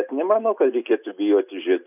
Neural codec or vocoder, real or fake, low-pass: none; real; 3.6 kHz